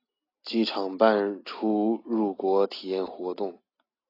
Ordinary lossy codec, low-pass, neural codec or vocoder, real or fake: AAC, 24 kbps; 5.4 kHz; none; real